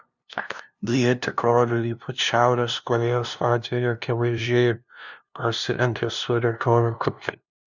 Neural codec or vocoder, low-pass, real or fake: codec, 16 kHz, 0.5 kbps, FunCodec, trained on LibriTTS, 25 frames a second; 7.2 kHz; fake